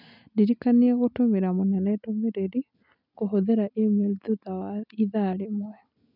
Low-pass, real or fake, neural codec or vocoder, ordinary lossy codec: 5.4 kHz; real; none; none